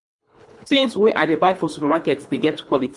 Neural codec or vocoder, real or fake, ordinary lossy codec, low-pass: codec, 24 kHz, 3 kbps, HILCodec; fake; MP3, 64 kbps; 10.8 kHz